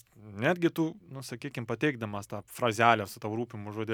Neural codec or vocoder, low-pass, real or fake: none; 19.8 kHz; real